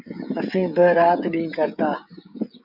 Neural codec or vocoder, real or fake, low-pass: codec, 16 kHz, 16 kbps, FreqCodec, smaller model; fake; 5.4 kHz